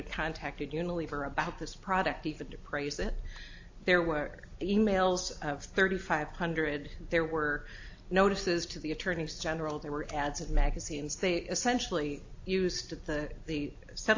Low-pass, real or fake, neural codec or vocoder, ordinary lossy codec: 7.2 kHz; real; none; AAC, 48 kbps